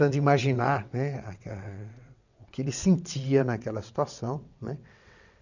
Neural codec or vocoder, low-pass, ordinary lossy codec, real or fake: vocoder, 22.05 kHz, 80 mel bands, WaveNeXt; 7.2 kHz; none; fake